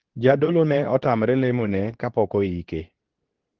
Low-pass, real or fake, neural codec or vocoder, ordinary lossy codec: 7.2 kHz; fake; codec, 24 kHz, 0.9 kbps, WavTokenizer, medium speech release version 2; Opus, 16 kbps